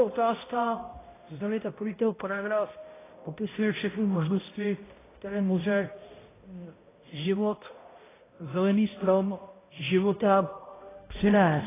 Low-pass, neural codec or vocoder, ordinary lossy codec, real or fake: 3.6 kHz; codec, 16 kHz, 0.5 kbps, X-Codec, HuBERT features, trained on balanced general audio; AAC, 16 kbps; fake